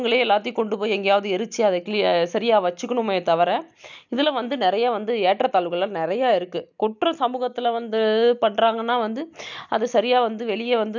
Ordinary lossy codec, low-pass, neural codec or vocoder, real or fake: none; 7.2 kHz; none; real